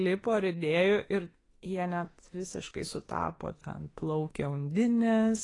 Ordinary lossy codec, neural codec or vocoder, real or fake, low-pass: AAC, 32 kbps; autoencoder, 48 kHz, 32 numbers a frame, DAC-VAE, trained on Japanese speech; fake; 10.8 kHz